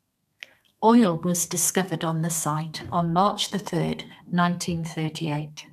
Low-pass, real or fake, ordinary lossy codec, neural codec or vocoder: 14.4 kHz; fake; none; codec, 32 kHz, 1.9 kbps, SNAC